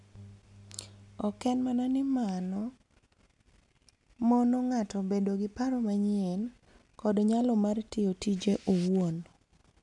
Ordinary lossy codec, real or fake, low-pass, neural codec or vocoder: none; real; 10.8 kHz; none